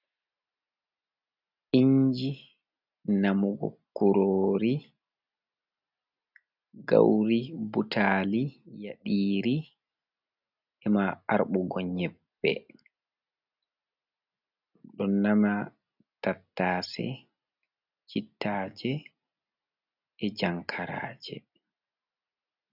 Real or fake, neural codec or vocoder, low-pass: real; none; 5.4 kHz